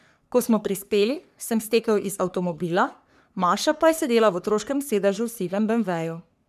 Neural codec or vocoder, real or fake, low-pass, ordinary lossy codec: codec, 44.1 kHz, 3.4 kbps, Pupu-Codec; fake; 14.4 kHz; none